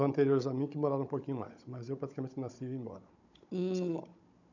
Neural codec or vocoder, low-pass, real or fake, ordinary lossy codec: codec, 16 kHz, 16 kbps, FunCodec, trained on Chinese and English, 50 frames a second; 7.2 kHz; fake; none